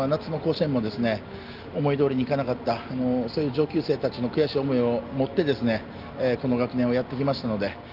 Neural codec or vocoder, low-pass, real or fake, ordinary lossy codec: none; 5.4 kHz; real; Opus, 32 kbps